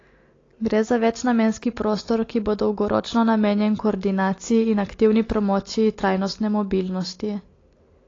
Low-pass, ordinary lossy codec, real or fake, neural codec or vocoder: 7.2 kHz; AAC, 32 kbps; real; none